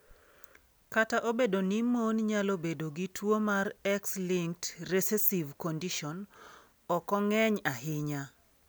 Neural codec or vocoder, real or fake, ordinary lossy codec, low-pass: none; real; none; none